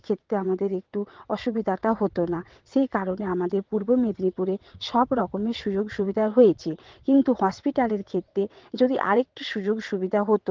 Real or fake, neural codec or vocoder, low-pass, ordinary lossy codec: fake; vocoder, 22.05 kHz, 80 mel bands, WaveNeXt; 7.2 kHz; Opus, 16 kbps